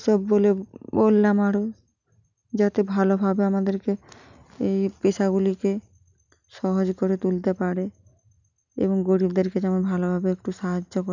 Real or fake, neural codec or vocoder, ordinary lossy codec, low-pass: real; none; none; 7.2 kHz